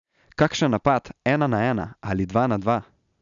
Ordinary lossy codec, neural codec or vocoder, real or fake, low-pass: none; none; real; 7.2 kHz